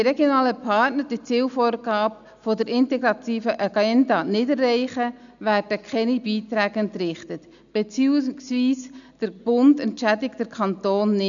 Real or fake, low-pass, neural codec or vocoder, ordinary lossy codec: real; 7.2 kHz; none; none